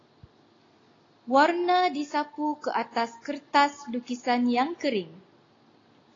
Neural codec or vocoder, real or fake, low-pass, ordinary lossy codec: none; real; 7.2 kHz; AAC, 32 kbps